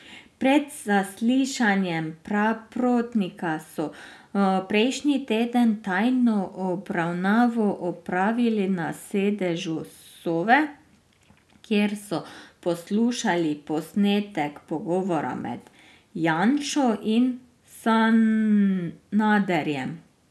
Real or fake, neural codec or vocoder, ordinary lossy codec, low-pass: real; none; none; none